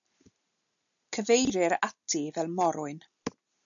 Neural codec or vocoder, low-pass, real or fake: none; 7.2 kHz; real